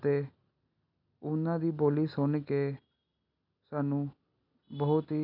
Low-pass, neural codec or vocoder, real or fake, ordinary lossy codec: 5.4 kHz; none; real; none